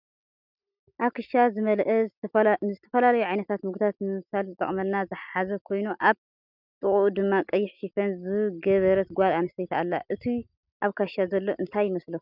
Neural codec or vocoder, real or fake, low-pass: none; real; 5.4 kHz